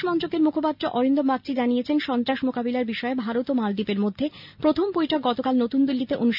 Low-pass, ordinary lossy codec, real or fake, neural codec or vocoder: 5.4 kHz; none; real; none